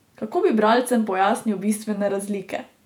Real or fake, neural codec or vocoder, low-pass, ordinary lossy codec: fake; vocoder, 48 kHz, 128 mel bands, Vocos; 19.8 kHz; none